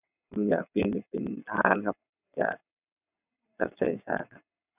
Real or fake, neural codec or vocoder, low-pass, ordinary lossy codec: fake; vocoder, 22.05 kHz, 80 mel bands, WaveNeXt; 3.6 kHz; none